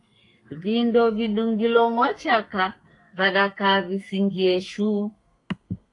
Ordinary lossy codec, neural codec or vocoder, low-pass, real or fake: AAC, 48 kbps; codec, 32 kHz, 1.9 kbps, SNAC; 10.8 kHz; fake